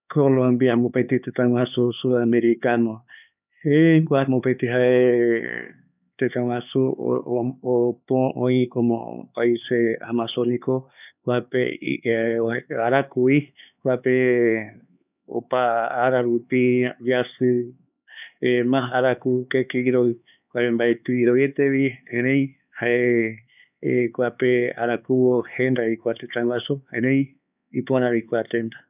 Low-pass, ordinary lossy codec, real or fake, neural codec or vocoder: 3.6 kHz; none; fake; codec, 16 kHz, 4 kbps, X-Codec, HuBERT features, trained on LibriSpeech